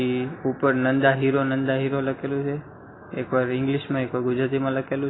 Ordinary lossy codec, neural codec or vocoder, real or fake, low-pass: AAC, 16 kbps; none; real; 7.2 kHz